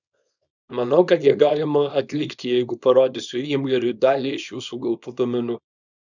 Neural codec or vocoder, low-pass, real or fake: codec, 24 kHz, 0.9 kbps, WavTokenizer, small release; 7.2 kHz; fake